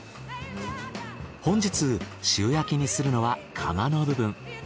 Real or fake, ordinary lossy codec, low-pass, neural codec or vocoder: real; none; none; none